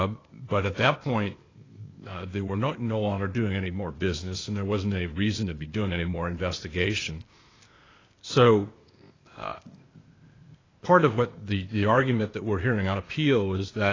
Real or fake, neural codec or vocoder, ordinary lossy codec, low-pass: fake; codec, 16 kHz, 0.8 kbps, ZipCodec; AAC, 32 kbps; 7.2 kHz